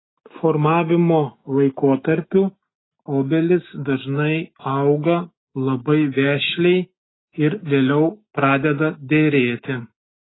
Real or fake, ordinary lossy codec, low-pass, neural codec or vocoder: real; AAC, 16 kbps; 7.2 kHz; none